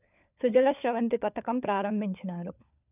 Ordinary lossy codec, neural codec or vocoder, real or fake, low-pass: none; codec, 16 kHz, 4 kbps, FunCodec, trained on LibriTTS, 50 frames a second; fake; 3.6 kHz